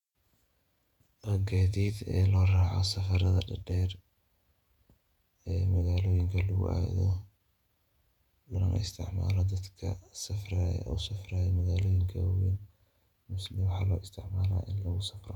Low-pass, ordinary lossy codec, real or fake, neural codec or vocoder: 19.8 kHz; none; fake; vocoder, 48 kHz, 128 mel bands, Vocos